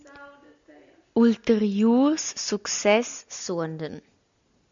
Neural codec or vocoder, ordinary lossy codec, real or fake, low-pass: none; MP3, 64 kbps; real; 7.2 kHz